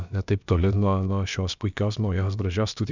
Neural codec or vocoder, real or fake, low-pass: codec, 16 kHz, about 1 kbps, DyCAST, with the encoder's durations; fake; 7.2 kHz